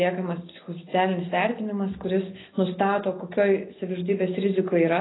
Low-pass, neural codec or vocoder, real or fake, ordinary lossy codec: 7.2 kHz; none; real; AAC, 16 kbps